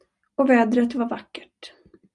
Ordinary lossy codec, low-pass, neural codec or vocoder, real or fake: Opus, 64 kbps; 10.8 kHz; none; real